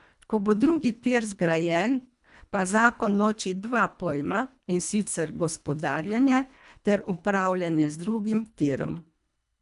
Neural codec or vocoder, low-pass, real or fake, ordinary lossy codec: codec, 24 kHz, 1.5 kbps, HILCodec; 10.8 kHz; fake; none